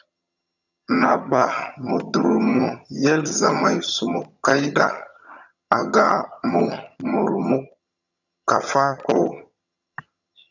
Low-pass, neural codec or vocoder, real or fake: 7.2 kHz; vocoder, 22.05 kHz, 80 mel bands, HiFi-GAN; fake